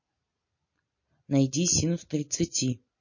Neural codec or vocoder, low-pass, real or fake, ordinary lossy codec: none; 7.2 kHz; real; MP3, 32 kbps